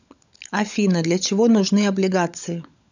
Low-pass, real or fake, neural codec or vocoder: 7.2 kHz; fake; codec, 16 kHz, 16 kbps, FunCodec, trained on LibriTTS, 50 frames a second